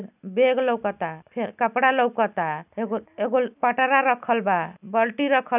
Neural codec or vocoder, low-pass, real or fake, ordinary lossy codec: none; 3.6 kHz; real; none